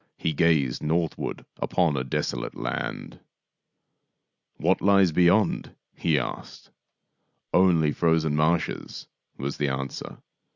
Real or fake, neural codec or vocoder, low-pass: real; none; 7.2 kHz